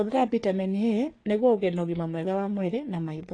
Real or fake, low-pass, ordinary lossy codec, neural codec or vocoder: fake; 9.9 kHz; AAC, 32 kbps; codec, 44.1 kHz, 3.4 kbps, Pupu-Codec